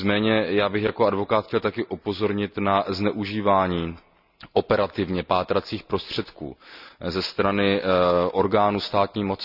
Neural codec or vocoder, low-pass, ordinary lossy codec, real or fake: none; 5.4 kHz; none; real